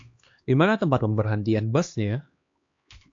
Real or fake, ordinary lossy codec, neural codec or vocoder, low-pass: fake; AAC, 48 kbps; codec, 16 kHz, 2 kbps, X-Codec, HuBERT features, trained on LibriSpeech; 7.2 kHz